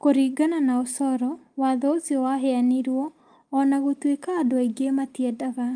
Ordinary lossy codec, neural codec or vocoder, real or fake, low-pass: Opus, 32 kbps; none; real; 9.9 kHz